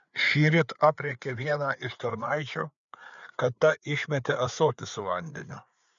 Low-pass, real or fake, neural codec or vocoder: 7.2 kHz; fake; codec, 16 kHz, 4 kbps, FreqCodec, larger model